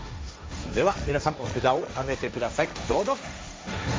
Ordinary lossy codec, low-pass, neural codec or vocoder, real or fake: none; none; codec, 16 kHz, 1.1 kbps, Voila-Tokenizer; fake